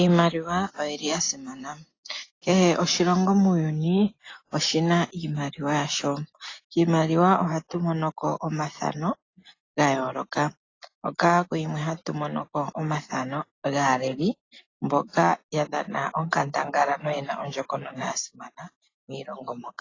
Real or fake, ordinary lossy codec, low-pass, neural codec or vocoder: real; AAC, 32 kbps; 7.2 kHz; none